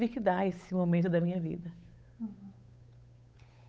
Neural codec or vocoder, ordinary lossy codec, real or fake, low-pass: codec, 16 kHz, 8 kbps, FunCodec, trained on Chinese and English, 25 frames a second; none; fake; none